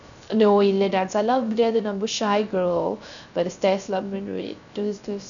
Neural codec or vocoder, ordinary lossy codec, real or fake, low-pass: codec, 16 kHz, 0.3 kbps, FocalCodec; none; fake; 7.2 kHz